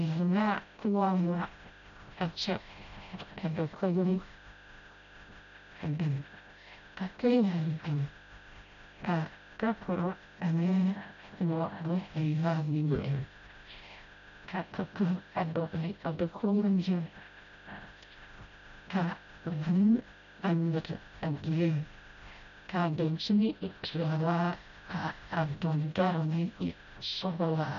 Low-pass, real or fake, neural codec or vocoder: 7.2 kHz; fake; codec, 16 kHz, 0.5 kbps, FreqCodec, smaller model